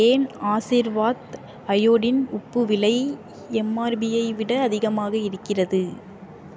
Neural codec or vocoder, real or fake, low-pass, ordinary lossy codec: none; real; none; none